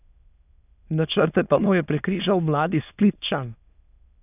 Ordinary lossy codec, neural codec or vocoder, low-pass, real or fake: none; autoencoder, 22.05 kHz, a latent of 192 numbers a frame, VITS, trained on many speakers; 3.6 kHz; fake